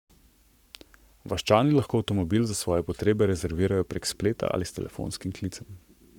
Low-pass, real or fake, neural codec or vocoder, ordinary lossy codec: 19.8 kHz; fake; codec, 44.1 kHz, 7.8 kbps, Pupu-Codec; none